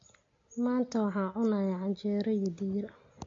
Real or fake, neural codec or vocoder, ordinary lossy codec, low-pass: real; none; AAC, 32 kbps; 7.2 kHz